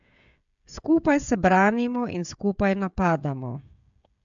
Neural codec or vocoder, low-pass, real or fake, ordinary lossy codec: codec, 16 kHz, 16 kbps, FreqCodec, smaller model; 7.2 kHz; fake; MP3, 64 kbps